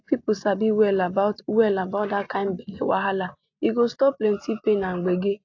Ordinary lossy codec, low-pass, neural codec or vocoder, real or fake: AAC, 48 kbps; 7.2 kHz; none; real